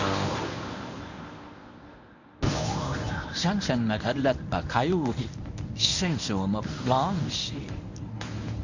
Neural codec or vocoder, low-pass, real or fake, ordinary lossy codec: codec, 24 kHz, 0.9 kbps, WavTokenizer, medium speech release version 1; 7.2 kHz; fake; none